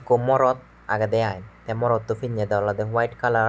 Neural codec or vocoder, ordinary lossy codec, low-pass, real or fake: none; none; none; real